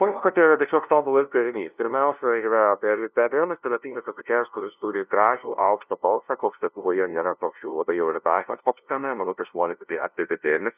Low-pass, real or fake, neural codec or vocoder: 3.6 kHz; fake; codec, 16 kHz, 0.5 kbps, FunCodec, trained on LibriTTS, 25 frames a second